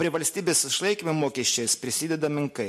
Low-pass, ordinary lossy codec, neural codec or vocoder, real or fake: 14.4 kHz; MP3, 64 kbps; none; real